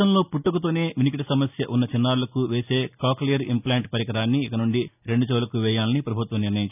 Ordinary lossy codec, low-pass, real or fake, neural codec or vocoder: none; 3.6 kHz; real; none